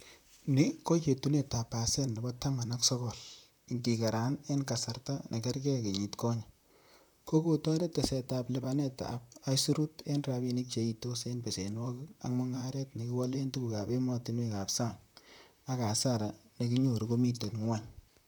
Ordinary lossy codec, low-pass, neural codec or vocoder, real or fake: none; none; vocoder, 44.1 kHz, 128 mel bands, Pupu-Vocoder; fake